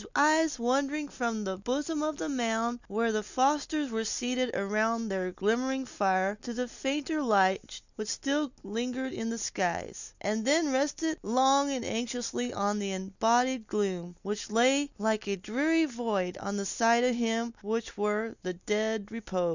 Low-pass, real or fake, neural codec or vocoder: 7.2 kHz; real; none